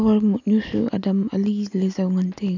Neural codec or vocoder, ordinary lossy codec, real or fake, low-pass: none; none; real; 7.2 kHz